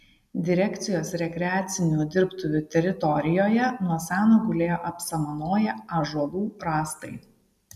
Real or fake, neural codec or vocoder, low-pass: real; none; 14.4 kHz